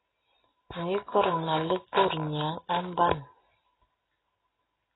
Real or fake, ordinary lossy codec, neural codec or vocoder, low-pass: real; AAC, 16 kbps; none; 7.2 kHz